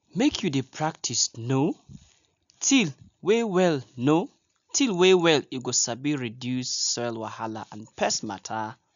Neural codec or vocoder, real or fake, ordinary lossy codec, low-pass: none; real; none; 7.2 kHz